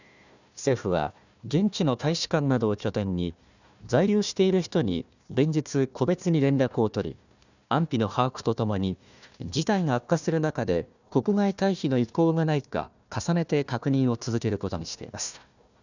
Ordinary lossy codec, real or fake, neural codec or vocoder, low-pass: none; fake; codec, 16 kHz, 1 kbps, FunCodec, trained on Chinese and English, 50 frames a second; 7.2 kHz